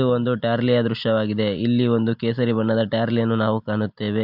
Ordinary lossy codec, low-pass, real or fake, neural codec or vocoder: MP3, 48 kbps; 5.4 kHz; real; none